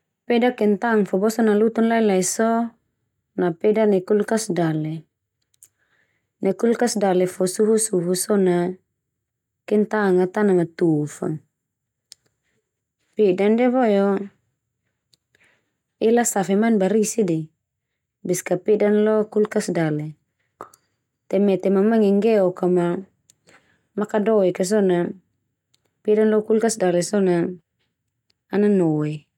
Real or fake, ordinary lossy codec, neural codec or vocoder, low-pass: real; none; none; 19.8 kHz